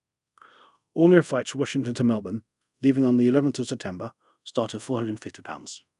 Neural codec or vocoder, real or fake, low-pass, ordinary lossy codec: codec, 24 kHz, 0.5 kbps, DualCodec; fake; 10.8 kHz; none